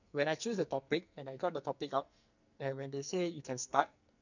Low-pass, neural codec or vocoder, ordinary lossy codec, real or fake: 7.2 kHz; codec, 44.1 kHz, 2.6 kbps, SNAC; none; fake